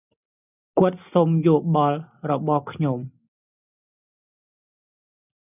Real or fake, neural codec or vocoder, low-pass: real; none; 3.6 kHz